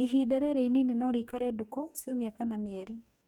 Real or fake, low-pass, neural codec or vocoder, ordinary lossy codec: fake; 19.8 kHz; codec, 44.1 kHz, 2.6 kbps, DAC; none